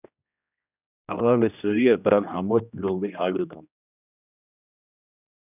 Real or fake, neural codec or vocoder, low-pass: fake; codec, 16 kHz, 1 kbps, X-Codec, HuBERT features, trained on general audio; 3.6 kHz